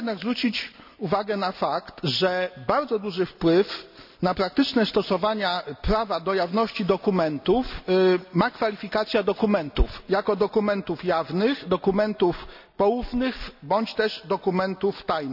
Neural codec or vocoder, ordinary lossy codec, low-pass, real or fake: none; none; 5.4 kHz; real